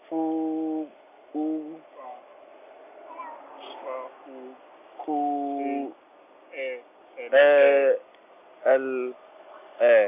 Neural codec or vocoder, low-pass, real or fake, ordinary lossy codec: none; 3.6 kHz; real; none